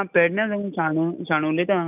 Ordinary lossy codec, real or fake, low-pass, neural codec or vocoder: none; real; 3.6 kHz; none